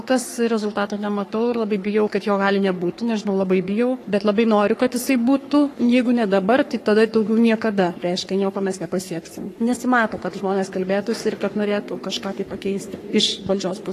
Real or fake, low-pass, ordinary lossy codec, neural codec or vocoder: fake; 14.4 kHz; AAC, 48 kbps; codec, 44.1 kHz, 3.4 kbps, Pupu-Codec